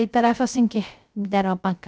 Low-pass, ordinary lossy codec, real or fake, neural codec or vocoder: none; none; fake; codec, 16 kHz, 0.3 kbps, FocalCodec